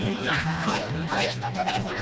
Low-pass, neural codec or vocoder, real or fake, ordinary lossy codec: none; codec, 16 kHz, 1 kbps, FreqCodec, smaller model; fake; none